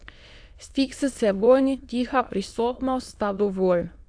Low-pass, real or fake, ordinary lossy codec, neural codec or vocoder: 9.9 kHz; fake; AAC, 64 kbps; autoencoder, 22.05 kHz, a latent of 192 numbers a frame, VITS, trained on many speakers